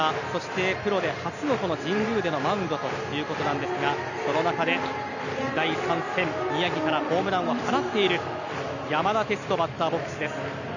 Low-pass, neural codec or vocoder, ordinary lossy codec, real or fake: 7.2 kHz; none; none; real